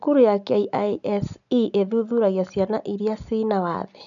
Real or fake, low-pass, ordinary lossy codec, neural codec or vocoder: real; 7.2 kHz; none; none